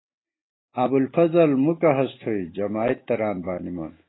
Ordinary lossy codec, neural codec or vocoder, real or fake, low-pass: AAC, 16 kbps; none; real; 7.2 kHz